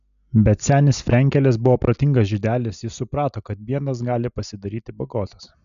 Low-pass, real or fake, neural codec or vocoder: 7.2 kHz; real; none